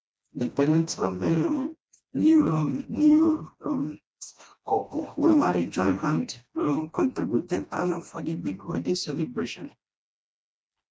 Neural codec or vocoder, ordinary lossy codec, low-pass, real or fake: codec, 16 kHz, 1 kbps, FreqCodec, smaller model; none; none; fake